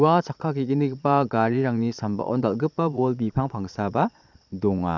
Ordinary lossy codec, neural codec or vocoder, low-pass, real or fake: none; vocoder, 22.05 kHz, 80 mel bands, Vocos; 7.2 kHz; fake